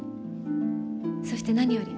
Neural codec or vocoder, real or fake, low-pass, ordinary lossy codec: none; real; none; none